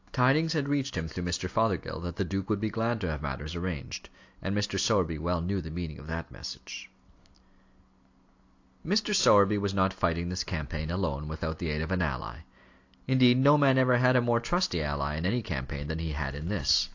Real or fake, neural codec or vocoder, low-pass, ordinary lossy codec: real; none; 7.2 kHz; AAC, 48 kbps